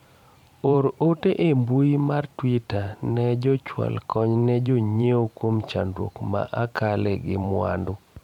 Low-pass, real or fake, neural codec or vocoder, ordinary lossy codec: 19.8 kHz; fake; vocoder, 44.1 kHz, 128 mel bands every 512 samples, BigVGAN v2; MP3, 96 kbps